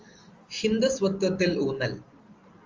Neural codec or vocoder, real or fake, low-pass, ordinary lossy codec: none; real; 7.2 kHz; Opus, 32 kbps